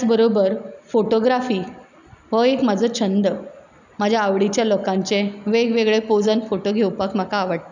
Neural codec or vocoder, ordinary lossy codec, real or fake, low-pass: none; none; real; 7.2 kHz